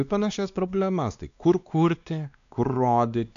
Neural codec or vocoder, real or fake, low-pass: codec, 16 kHz, 2 kbps, X-Codec, WavLM features, trained on Multilingual LibriSpeech; fake; 7.2 kHz